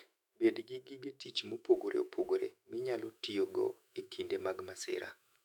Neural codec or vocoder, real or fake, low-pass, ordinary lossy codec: autoencoder, 48 kHz, 128 numbers a frame, DAC-VAE, trained on Japanese speech; fake; 19.8 kHz; none